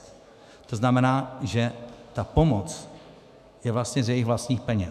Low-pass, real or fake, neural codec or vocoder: 14.4 kHz; fake; autoencoder, 48 kHz, 128 numbers a frame, DAC-VAE, trained on Japanese speech